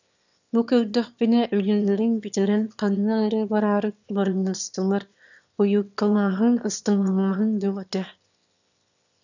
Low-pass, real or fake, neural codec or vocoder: 7.2 kHz; fake; autoencoder, 22.05 kHz, a latent of 192 numbers a frame, VITS, trained on one speaker